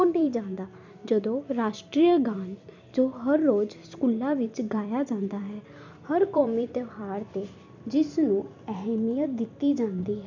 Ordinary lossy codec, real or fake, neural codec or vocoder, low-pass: none; fake; vocoder, 44.1 kHz, 128 mel bands every 256 samples, BigVGAN v2; 7.2 kHz